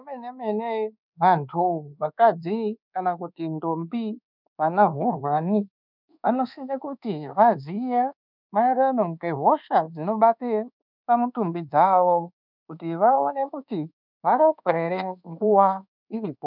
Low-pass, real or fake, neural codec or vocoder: 5.4 kHz; fake; codec, 24 kHz, 1.2 kbps, DualCodec